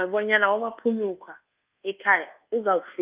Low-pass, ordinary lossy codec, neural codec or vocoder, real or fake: 3.6 kHz; Opus, 32 kbps; codec, 24 kHz, 1.2 kbps, DualCodec; fake